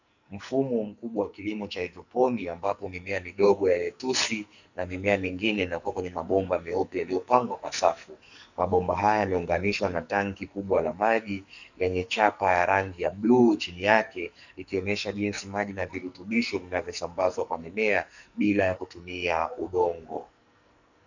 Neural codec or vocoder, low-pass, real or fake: codec, 44.1 kHz, 2.6 kbps, SNAC; 7.2 kHz; fake